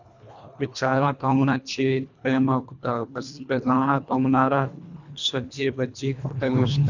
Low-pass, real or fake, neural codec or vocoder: 7.2 kHz; fake; codec, 24 kHz, 1.5 kbps, HILCodec